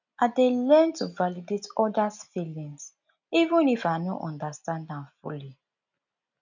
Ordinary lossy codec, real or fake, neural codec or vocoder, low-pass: none; real; none; 7.2 kHz